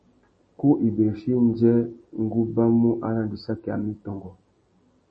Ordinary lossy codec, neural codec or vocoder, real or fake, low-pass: MP3, 32 kbps; none; real; 9.9 kHz